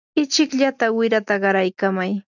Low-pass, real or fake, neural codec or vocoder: 7.2 kHz; real; none